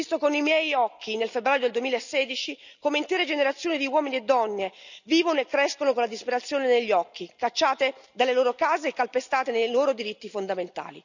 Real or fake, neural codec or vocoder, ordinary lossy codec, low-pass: real; none; none; 7.2 kHz